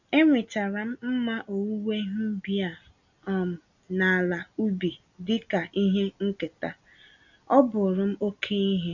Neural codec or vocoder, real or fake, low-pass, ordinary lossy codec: none; real; 7.2 kHz; none